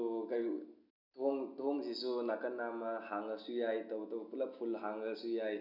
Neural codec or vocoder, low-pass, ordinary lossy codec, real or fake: none; 5.4 kHz; none; real